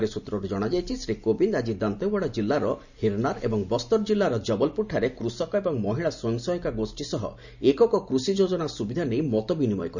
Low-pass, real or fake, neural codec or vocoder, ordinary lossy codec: 7.2 kHz; real; none; none